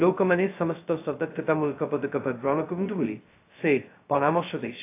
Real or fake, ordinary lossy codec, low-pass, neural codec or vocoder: fake; AAC, 24 kbps; 3.6 kHz; codec, 16 kHz, 0.2 kbps, FocalCodec